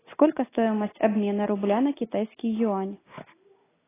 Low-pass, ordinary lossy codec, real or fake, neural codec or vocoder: 3.6 kHz; AAC, 16 kbps; real; none